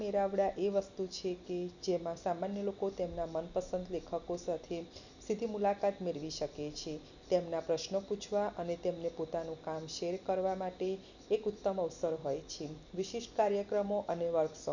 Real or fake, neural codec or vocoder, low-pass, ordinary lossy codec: real; none; 7.2 kHz; none